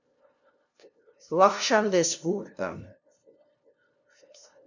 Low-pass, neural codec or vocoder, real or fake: 7.2 kHz; codec, 16 kHz, 0.5 kbps, FunCodec, trained on LibriTTS, 25 frames a second; fake